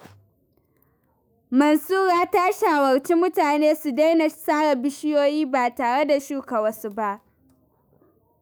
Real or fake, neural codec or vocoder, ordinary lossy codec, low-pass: fake; autoencoder, 48 kHz, 128 numbers a frame, DAC-VAE, trained on Japanese speech; none; none